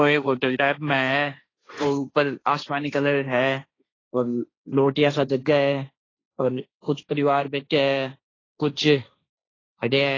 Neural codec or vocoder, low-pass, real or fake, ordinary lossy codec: codec, 16 kHz, 1.1 kbps, Voila-Tokenizer; 7.2 kHz; fake; AAC, 32 kbps